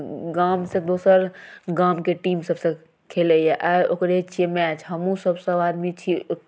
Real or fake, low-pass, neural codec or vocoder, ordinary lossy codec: real; none; none; none